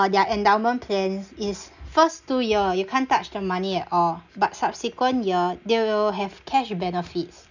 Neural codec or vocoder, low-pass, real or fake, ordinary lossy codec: none; 7.2 kHz; real; none